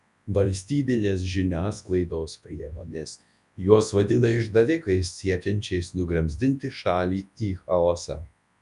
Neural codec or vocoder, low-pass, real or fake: codec, 24 kHz, 0.9 kbps, WavTokenizer, large speech release; 10.8 kHz; fake